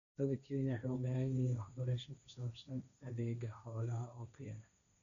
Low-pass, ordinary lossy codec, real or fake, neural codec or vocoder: 7.2 kHz; none; fake; codec, 16 kHz, 1.1 kbps, Voila-Tokenizer